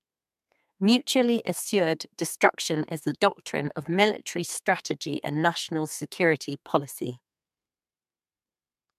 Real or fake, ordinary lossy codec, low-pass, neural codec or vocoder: fake; MP3, 96 kbps; 14.4 kHz; codec, 32 kHz, 1.9 kbps, SNAC